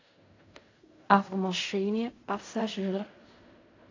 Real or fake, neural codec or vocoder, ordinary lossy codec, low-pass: fake; codec, 16 kHz in and 24 kHz out, 0.4 kbps, LongCat-Audio-Codec, fine tuned four codebook decoder; AAC, 32 kbps; 7.2 kHz